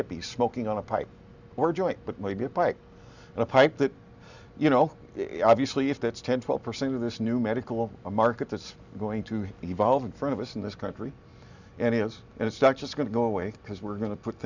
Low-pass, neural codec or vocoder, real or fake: 7.2 kHz; none; real